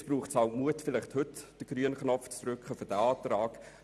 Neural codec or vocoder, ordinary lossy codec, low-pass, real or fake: none; none; none; real